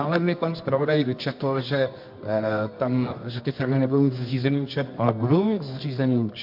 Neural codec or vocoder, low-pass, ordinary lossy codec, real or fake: codec, 24 kHz, 0.9 kbps, WavTokenizer, medium music audio release; 5.4 kHz; MP3, 48 kbps; fake